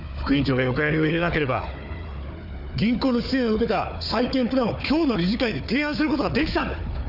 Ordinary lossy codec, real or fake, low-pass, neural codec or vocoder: none; fake; 5.4 kHz; codec, 16 kHz, 4 kbps, FunCodec, trained on Chinese and English, 50 frames a second